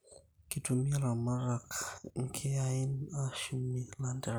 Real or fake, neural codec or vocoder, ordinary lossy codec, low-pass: real; none; none; none